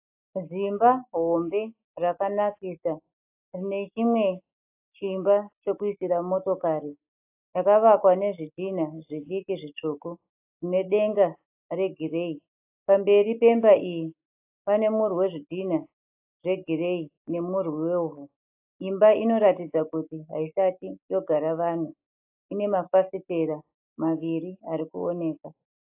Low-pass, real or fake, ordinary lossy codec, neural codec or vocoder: 3.6 kHz; real; AAC, 32 kbps; none